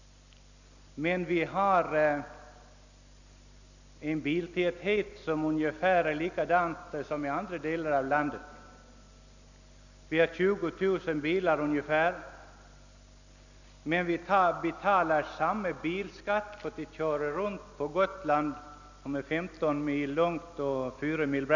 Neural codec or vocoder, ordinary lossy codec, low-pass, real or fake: none; none; 7.2 kHz; real